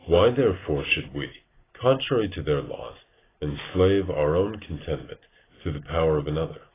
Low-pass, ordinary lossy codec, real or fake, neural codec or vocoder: 3.6 kHz; AAC, 16 kbps; real; none